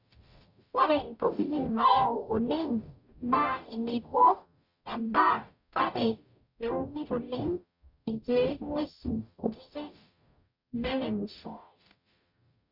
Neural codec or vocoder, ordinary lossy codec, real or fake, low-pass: codec, 44.1 kHz, 0.9 kbps, DAC; none; fake; 5.4 kHz